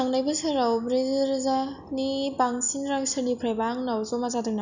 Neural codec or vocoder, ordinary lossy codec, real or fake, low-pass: none; none; real; 7.2 kHz